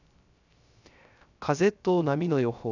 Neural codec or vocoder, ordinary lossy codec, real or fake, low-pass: codec, 16 kHz, 0.3 kbps, FocalCodec; none; fake; 7.2 kHz